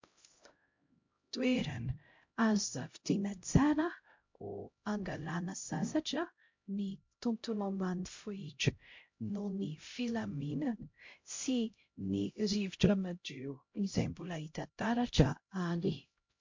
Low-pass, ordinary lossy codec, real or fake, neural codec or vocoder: 7.2 kHz; MP3, 48 kbps; fake; codec, 16 kHz, 0.5 kbps, X-Codec, HuBERT features, trained on LibriSpeech